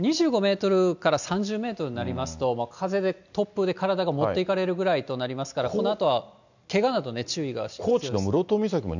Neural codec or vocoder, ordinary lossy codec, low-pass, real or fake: none; none; 7.2 kHz; real